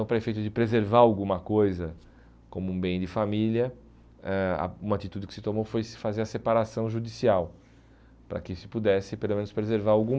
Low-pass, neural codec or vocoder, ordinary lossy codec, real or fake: none; none; none; real